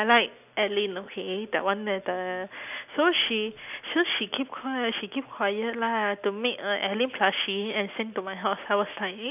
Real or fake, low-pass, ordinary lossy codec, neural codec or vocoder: real; 3.6 kHz; none; none